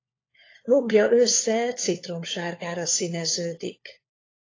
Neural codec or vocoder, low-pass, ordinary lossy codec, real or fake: codec, 16 kHz, 4 kbps, FunCodec, trained on LibriTTS, 50 frames a second; 7.2 kHz; AAC, 32 kbps; fake